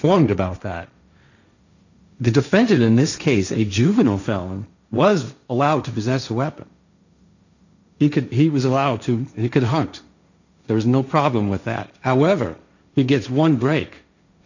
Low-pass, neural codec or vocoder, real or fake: 7.2 kHz; codec, 16 kHz, 1.1 kbps, Voila-Tokenizer; fake